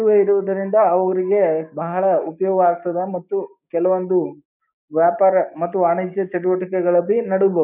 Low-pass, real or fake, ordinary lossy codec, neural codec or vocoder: 3.6 kHz; fake; none; codec, 16 kHz, 6 kbps, DAC